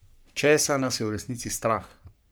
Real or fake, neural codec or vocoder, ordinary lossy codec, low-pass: fake; codec, 44.1 kHz, 3.4 kbps, Pupu-Codec; none; none